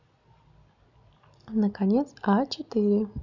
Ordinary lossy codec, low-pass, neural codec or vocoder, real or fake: none; 7.2 kHz; none; real